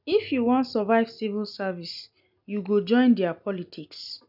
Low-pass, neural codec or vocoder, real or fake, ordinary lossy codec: 5.4 kHz; none; real; none